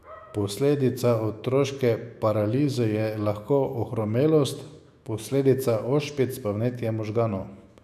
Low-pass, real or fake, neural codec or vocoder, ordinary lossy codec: 14.4 kHz; fake; autoencoder, 48 kHz, 128 numbers a frame, DAC-VAE, trained on Japanese speech; none